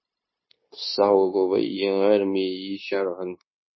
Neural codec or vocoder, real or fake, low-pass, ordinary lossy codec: codec, 16 kHz, 0.9 kbps, LongCat-Audio-Codec; fake; 7.2 kHz; MP3, 24 kbps